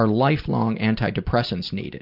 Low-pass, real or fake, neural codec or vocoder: 5.4 kHz; real; none